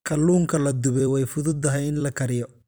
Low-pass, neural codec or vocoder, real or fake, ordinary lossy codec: none; vocoder, 44.1 kHz, 128 mel bands every 256 samples, BigVGAN v2; fake; none